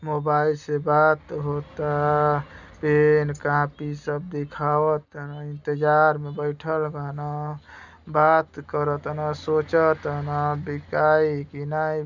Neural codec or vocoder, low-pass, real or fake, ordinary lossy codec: none; 7.2 kHz; real; none